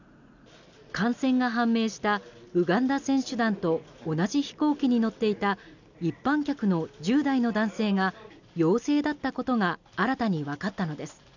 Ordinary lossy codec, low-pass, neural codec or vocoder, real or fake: none; 7.2 kHz; none; real